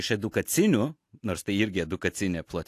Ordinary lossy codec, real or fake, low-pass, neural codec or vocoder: AAC, 64 kbps; real; 14.4 kHz; none